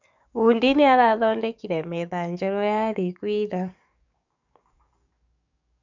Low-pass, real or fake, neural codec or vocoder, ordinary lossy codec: 7.2 kHz; fake; codec, 44.1 kHz, 7.8 kbps, DAC; none